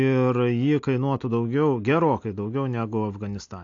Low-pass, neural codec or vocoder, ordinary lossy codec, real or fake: 7.2 kHz; none; AAC, 48 kbps; real